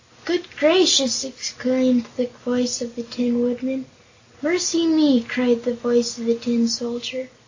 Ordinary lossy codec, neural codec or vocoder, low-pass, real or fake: AAC, 48 kbps; none; 7.2 kHz; real